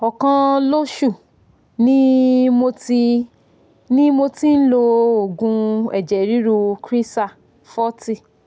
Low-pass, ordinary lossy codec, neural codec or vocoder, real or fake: none; none; none; real